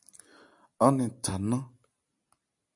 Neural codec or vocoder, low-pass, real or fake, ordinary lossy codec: none; 10.8 kHz; real; MP3, 96 kbps